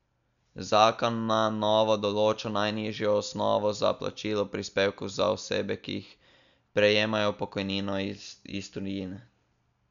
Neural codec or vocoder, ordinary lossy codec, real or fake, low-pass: none; none; real; 7.2 kHz